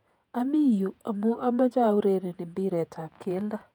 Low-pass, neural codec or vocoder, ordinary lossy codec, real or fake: 19.8 kHz; vocoder, 48 kHz, 128 mel bands, Vocos; none; fake